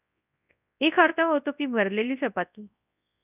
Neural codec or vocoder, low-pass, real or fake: codec, 24 kHz, 0.9 kbps, WavTokenizer, large speech release; 3.6 kHz; fake